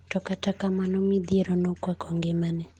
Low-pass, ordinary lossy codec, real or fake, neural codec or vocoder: 19.8 kHz; Opus, 16 kbps; real; none